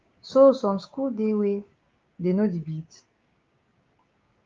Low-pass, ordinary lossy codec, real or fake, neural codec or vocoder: 7.2 kHz; Opus, 32 kbps; fake; codec, 16 kHz, 6 kbps, DAC